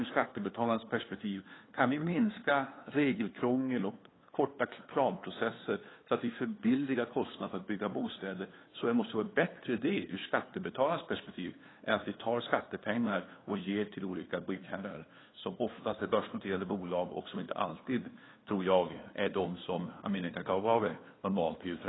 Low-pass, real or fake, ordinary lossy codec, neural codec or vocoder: 7.2 kHz; fake; AAC, 16 kbps; codec, 16 kHz, 2 kbps, FunCodec, trained on LibriTTS, 25 frames a second